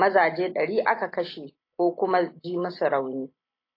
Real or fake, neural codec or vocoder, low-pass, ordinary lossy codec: real; none; 5.4 kHz; AAC, 32 kbps